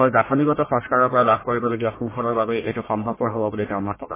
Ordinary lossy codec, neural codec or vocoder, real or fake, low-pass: MP3, 16 kbps; codec, 44.1 kHz, 1.7 kbps, Pupu-Codec; fake; 3.6 kHz